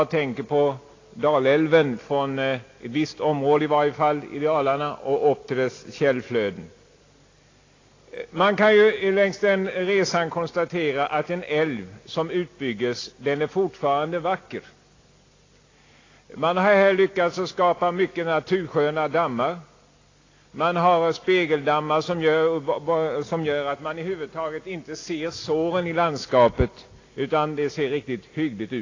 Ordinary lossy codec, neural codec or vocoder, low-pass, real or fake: AAC, 32 kbps; none; 7.2 kHz; real